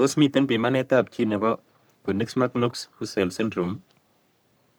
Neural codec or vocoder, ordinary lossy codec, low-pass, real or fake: codec, 44.1 kHz, 3.4 kbps, Pupu-Codec; none; none; fake